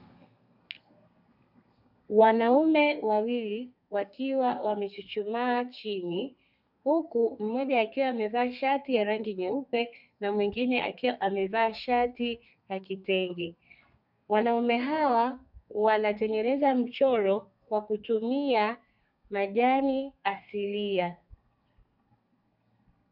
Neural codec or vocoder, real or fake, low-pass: codec, 32 kHz, 1.9 kbps, SNAC; fake; 5.4 kHz